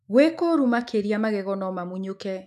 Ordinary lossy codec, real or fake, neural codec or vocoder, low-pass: none; fake; autoencoder, 48 kHz, 128 numbers a frame, DAC-VAE, trained on Japanese speech; 14.4 kHz